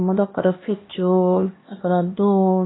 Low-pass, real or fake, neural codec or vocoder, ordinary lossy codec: 7.2 kHz; fake; codec, 16 kHz, 1 kbps, X-Codec, HuBERT features, trained on LibriSpeech; AAC, 16 kbps